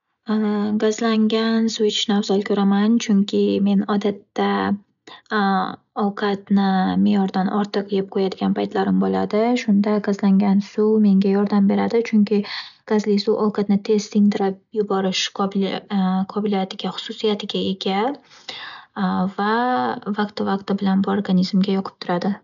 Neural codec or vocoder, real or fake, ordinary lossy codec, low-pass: none; real; none; 7.2 kHz